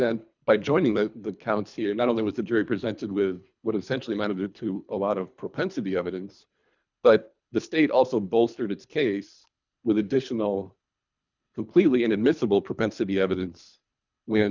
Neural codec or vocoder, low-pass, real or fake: codec, 24 kHz, 3 kbps, HILCodec; 7.2 kHz; fake